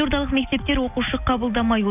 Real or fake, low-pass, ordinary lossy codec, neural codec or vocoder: real; 3.6 kHz; none; none